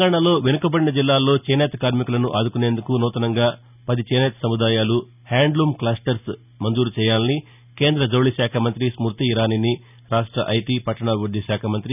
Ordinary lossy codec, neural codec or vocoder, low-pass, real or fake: none; none; 3.6 kHz; real